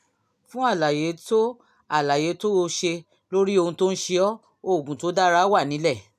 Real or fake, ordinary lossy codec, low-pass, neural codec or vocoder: real; none; 14.4 kHz; none